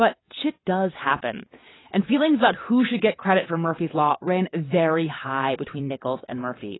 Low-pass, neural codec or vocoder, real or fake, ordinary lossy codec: 7.2 kHz; vocoder, 22.05 kHz, 80 mel bands, WaveNeXt; fake; AAC, 16 kbps